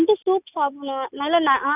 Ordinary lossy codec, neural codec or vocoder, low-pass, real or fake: none; none; 3.6 kHz; real